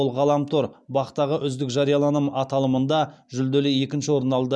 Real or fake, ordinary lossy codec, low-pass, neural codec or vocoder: real; none; none; none